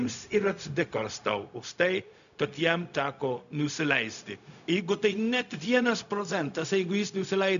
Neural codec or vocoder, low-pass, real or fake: codec, 16 kHz, 0.4 kbps, LongCat-Audio-Codec; 7.2 kHz; fake